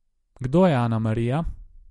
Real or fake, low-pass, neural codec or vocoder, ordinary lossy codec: real; 19.8 kHz; none; MP3, 48 kbps